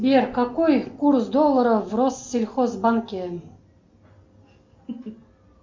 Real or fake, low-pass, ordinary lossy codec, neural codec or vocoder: real; 7.2 kHz; MP3, 48 kbps; none